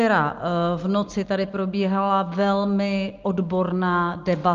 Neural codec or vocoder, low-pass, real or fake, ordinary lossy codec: none; 7.2 kHz; real; Opus, 24 kbps